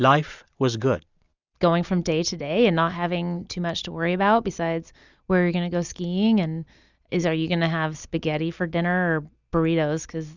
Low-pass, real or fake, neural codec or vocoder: 7.2 kHz; real; none